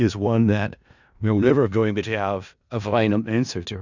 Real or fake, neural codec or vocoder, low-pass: fake; codec, 16 kHz in and 24 kHz out, 0.4 kbps, LongCat-Audio-Codec, four codebook decoder; 7.2 kHz